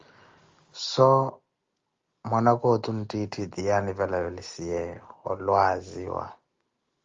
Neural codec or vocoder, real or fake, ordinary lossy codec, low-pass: none; real; Opus, 32 kbps; 7.2 kHz